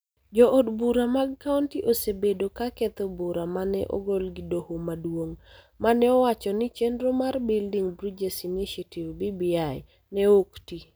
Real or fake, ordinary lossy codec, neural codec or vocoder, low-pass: real; none; none; none